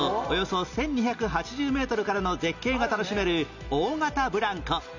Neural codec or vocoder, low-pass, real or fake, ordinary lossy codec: none; 7.2 kHz; real; none